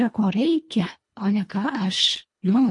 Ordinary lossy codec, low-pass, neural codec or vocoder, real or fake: MP3, 48 kbps; 10.8 kHz; codec, 24 kHz, 1.5 kbps, HILCodec; fake